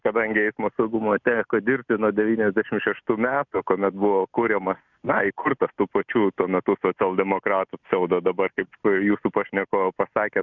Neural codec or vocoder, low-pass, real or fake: none; 7.2 kHz; real